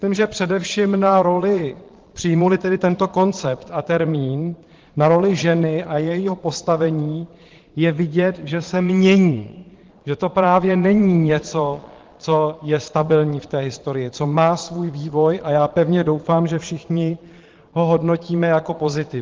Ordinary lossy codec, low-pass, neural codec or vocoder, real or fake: Opus, 16 kbps; 7.2 kHz; vocoder, 22.05 kHz, 80 mel bands, Vocos; fake